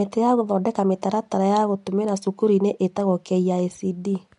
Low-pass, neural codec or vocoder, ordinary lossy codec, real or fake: 10.8 kHz; none; MP3, 64 kbps; real